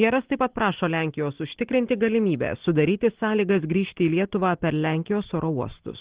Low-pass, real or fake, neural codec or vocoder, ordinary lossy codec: 3.6 kHz; real; none; Opus, 16 kbps